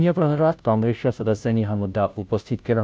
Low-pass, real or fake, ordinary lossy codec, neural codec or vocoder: none; fake; none; codec, 16 kHz, 0.5 kbps, FunCodec, trained on Chinese and English, 25 frames a second